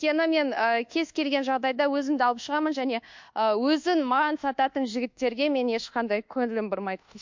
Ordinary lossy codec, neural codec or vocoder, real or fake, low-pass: MP3, 48 kbps; codec, 24 kHz, 1.2 kbps, DualCodec; fake; 7.2 kHz